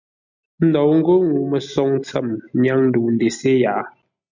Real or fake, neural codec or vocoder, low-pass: real; none; 7.2 kHz